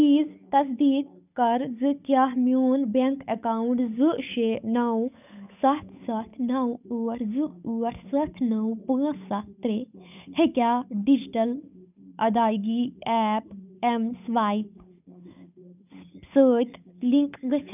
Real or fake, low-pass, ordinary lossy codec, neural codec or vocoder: fake; 3.6 kHz; none; codec, 16 kHz, 8 kbps, FunCodec, trained on Chinese and English, 25 frames a second